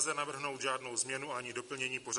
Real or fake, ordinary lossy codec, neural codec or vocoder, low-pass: real; MP3, 48 kbps; none; 10.8 kHz